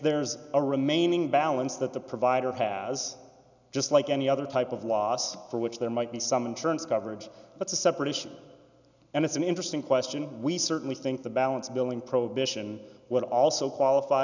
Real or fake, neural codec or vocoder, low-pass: real; none; 7.2 kHz